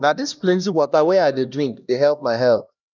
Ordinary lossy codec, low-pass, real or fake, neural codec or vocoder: none; 7.2 kHz; fake; codec, 16 kHz, 1 kbps, X-Codec, HuBERT features, trained on LibriSpeech